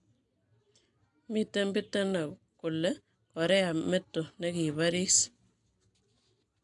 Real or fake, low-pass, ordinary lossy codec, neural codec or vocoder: real; 10.8 kHz; AAC, 64 kbps; none